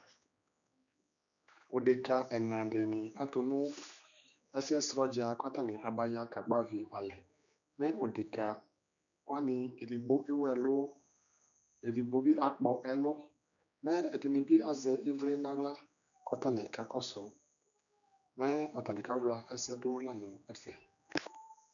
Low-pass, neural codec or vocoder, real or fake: 7.2 kHz; codec, 16 kHz, 2 kbps, X-Codec, HuBERT features, trained on general audio; fake